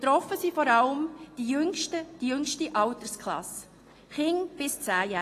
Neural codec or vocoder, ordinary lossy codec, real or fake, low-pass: none; AAC, 48 kbps; real; 14.4 kHz